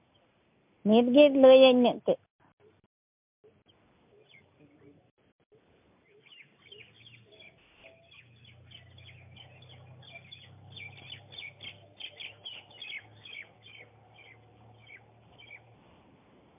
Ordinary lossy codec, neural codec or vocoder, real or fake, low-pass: MP3, 32 kbps; none; real; 3.6 kHz